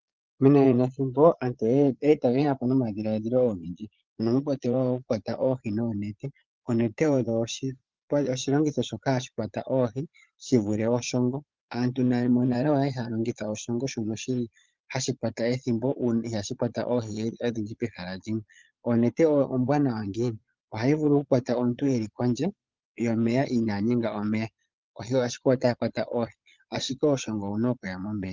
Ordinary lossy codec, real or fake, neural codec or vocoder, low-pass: Opus, 32 kbps; fake; vocoder, 22.05 kHz, 80 mel bands, Vocos; 7.2 kHz